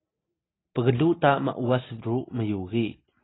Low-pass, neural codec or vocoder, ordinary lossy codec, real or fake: 7.2 kHz; none; AAC, 16 kbps; real